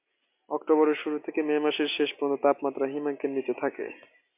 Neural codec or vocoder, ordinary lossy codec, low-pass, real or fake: none; MP3, 32 kbps; 3.6 kHz; real